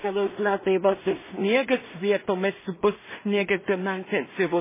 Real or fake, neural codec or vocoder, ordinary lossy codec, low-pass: fake; codec, 16 kHz in and 24 kHz out, 0.4 kbps, LongCat-Audio-Codec, two codebook decoder; MP3, 16 kbps; 3.6 kHz